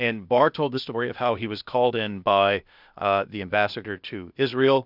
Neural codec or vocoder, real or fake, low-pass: codec, 16 kHz, 0.8 kbps, ZipCodec; fake; 5.4 kHz